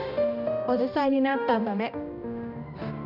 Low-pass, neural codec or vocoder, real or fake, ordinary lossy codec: 5.4 kHz; codec, 16 kHz, 1 kbps, X-Codec, HuBERT features, trained on balanced general audio; fake; none